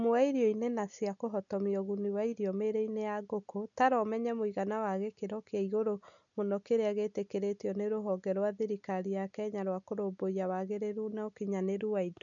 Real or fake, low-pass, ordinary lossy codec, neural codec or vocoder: real; 7.2 kHz; none; none